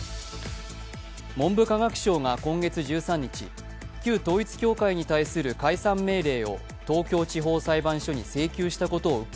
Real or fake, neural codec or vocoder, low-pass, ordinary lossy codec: real; none; none; none